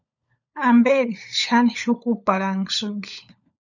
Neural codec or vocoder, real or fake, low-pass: codec, 16 kHz, 16 kbps, FunCodec, trained on LibriTTS, 50 frames a second; fake; 7.2 kHz